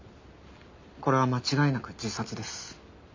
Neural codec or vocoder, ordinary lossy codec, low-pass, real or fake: none; MP3, 48 kbps; 7.2 kHz; real